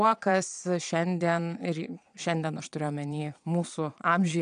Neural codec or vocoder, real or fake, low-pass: vocoder, 22.05 kHz, 80 mel bands, Vocos; fake; 9.9 kHz